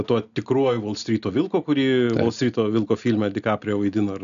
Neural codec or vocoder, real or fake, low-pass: none; real; 7.2 kHz